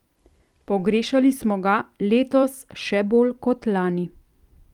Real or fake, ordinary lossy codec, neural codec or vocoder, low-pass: fake; Opus, 32 kbps; vocoder, 44.1 kHz, 128 mel bands every 256 samples, BigVGAN v2; 19.8 kHz